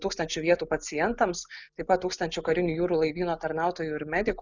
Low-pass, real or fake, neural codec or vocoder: 7.2 kHz; real; none